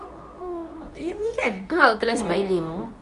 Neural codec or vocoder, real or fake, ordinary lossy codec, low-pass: codec, 24 kHz, 0.9 kbps, WavTokenizer, medium speech release version 2; fake; none; 10.8 kHz